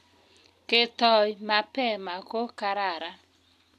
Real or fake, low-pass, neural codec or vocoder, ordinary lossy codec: real; 14.4 kHz; none; MP3, 96 kbps